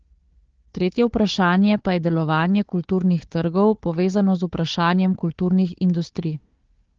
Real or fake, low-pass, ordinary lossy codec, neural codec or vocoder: fake; 7.2 kHz; Opus, 16 kbps; codec, 16 kHz, 4 kbps, FunCodec, trained on Chinese and English, 50 frames a second